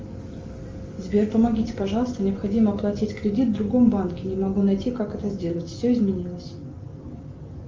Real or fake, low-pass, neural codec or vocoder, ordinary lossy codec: real; 7.2 kHz; none; Opus, 32 kbps